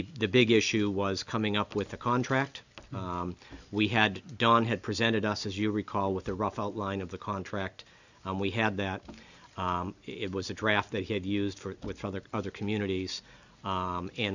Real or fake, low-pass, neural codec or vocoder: real; 7.2 kHz; none